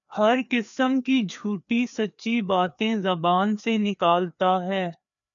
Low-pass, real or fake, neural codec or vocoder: 7.2 kHz; fake; codec, 16 kHz, 2 kbps, FreqCodec, larger model